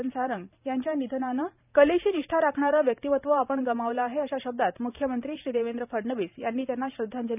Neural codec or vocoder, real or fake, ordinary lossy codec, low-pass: vocoder, 44.1 kHz, 128 mel bands every 512 samples, BigVGAN v2; fake; none; 3.6 kHz